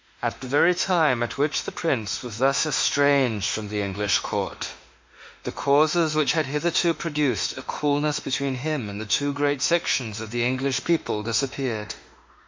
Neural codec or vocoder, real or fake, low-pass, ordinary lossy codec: autoencoder, 48 kHz, 32 numbers a frame, DAC-VAE, trained on Japanese speech; fake; 7.2 kHz; MP3, 48 kbps